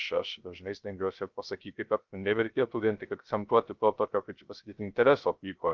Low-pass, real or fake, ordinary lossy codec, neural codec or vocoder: 7.2 kHz; fake; Opus, 32 kbps; codec, 16 kHz, 0.3 kbps, FocalCodec